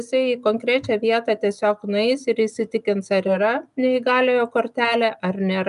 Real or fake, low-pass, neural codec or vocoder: real; 10.8 kHz; none